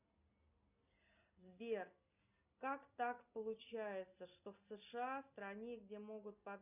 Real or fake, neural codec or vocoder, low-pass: real; none; 3.6 kHz